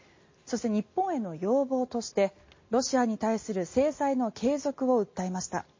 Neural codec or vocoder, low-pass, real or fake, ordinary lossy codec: none; 7.2 kHz; real; MP3, 32 kbps